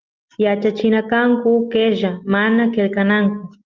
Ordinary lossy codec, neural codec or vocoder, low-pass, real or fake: Opus, 32 kbps; none; 7.2 kHz; real